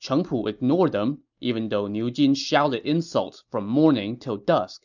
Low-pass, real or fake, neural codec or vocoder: 7.2 kHz; real; none